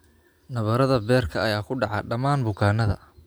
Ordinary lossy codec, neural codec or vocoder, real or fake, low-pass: none; none; real; none